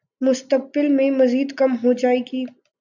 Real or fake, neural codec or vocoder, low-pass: real; none; 7.2 kHz